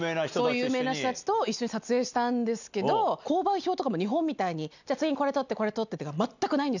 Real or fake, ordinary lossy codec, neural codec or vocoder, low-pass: real; none; none; 7.2 kHz